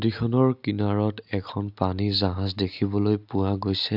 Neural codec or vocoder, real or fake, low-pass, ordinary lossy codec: none; real; 5.4 kHz; AAC, 48 kbps